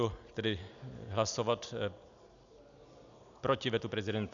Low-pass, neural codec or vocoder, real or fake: 7.2 kHz; none; real